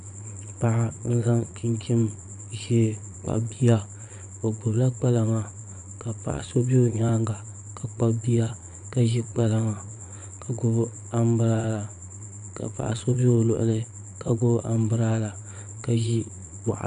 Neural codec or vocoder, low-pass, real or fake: vocoder, 22.05 kHz, 80 mel bands, Vocos; 9.9 kHz; fake